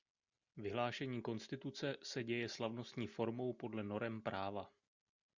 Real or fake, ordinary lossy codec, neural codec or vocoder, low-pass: real; Opus, 64 kbps; none; 7.2 kHz